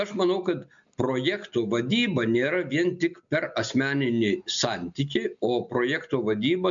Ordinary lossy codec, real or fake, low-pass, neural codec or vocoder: MP3, 64 kbps; real; 7.2 kHz; none